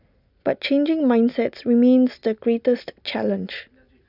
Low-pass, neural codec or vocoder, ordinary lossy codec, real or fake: 5.4 kHz; none; none; real